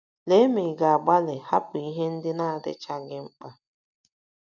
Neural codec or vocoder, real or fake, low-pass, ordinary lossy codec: none; real; 7.2 kHz; none